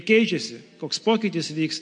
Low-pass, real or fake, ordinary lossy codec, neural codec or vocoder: 9.9 kHz; real; MP3, 48 kbps; none